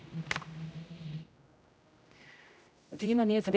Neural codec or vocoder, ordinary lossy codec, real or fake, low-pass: codec, 16 kHz, 0.5 kbps, X-Codec, HuBERT features, trained on general audio; none; fake; none